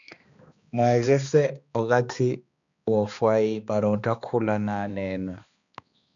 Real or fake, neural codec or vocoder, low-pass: fake; codec, 16 kHz, 2 kbps, X-Codec, HuBERT features, trained on balanced general audio; 7.2 kHz